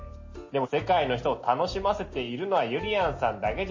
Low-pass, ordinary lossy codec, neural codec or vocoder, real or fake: 7.2 kHz; MP3, 32 kbps; none; real